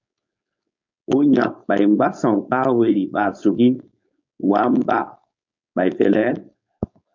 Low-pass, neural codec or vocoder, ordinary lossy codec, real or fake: 7.2 kHz; codec, 16 kHz, 4.8 kbps, FACodec; MP3, 64 kbps; fake